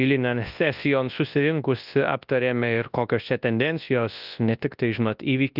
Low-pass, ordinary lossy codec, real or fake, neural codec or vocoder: 5.4 kHz; Opus, 32 kbps; fake; codec, 24 kHz, 0.9 kbps, WavTokenizer, large speech release